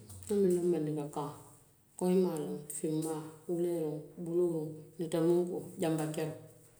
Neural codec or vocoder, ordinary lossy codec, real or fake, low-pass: none; none; real; none